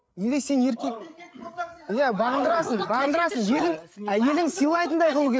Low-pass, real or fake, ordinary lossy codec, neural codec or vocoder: none; fake; none; codec, 16 kHz, 8 kbps, FreqCodec, larger model